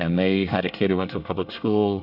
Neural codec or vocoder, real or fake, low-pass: codec, 24 kHz, 1 kbps, SNAC; fake; 5.4 kHz